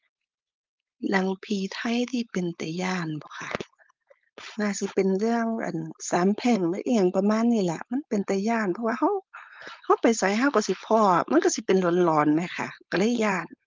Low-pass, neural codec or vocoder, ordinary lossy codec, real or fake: 7.2 kHz; codec, 16 kHz, 4.8 kbps, FACodec; Opus, 24 kbps; fake